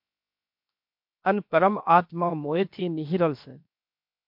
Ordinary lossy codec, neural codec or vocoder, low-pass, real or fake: MP3, 48 kbps; codec, 16 kHz, 0.7 kbps, FocalCodec; 5.4 kHz; fake